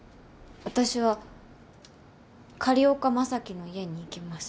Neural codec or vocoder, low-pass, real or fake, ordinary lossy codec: none; none; real; none